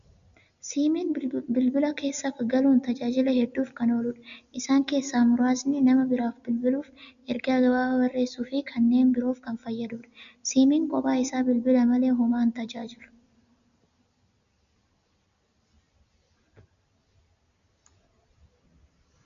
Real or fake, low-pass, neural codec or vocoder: real; 7.2 kHz; none